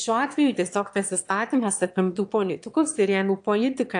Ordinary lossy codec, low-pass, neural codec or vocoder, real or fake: AAC, 64 kbps; 9.9 kHz; autoencoder, 22.05 kHz, a latent of 192 numbers a frame, VITS, trained on one speaker; fake